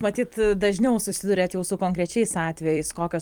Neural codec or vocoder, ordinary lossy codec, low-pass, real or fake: vocoder, 44.1 kHz, 128 mel bands every 256 samples, BigVGAN v2; Opus, 32 kbps; 19.8 kHz; fake